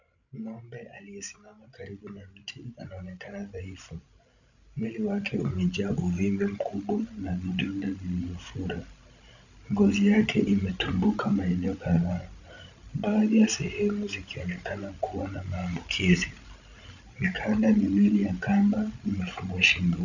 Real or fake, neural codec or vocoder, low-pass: fake; codec, 16 kHz, 16 kbps, FreqCodec, larger model; 7.2 kHz